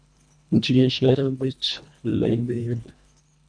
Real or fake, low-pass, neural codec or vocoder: fake; 9.9 kHz; codec, 24 kHz, 1.5 kbps, HILCodec